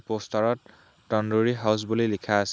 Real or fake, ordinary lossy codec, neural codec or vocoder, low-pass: real; none; none; none